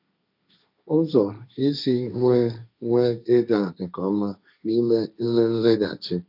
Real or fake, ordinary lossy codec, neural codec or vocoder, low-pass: fake; none; codec, 16 kHz, 1.1 kbps, Voila-Tokenizer; 5.4 kHz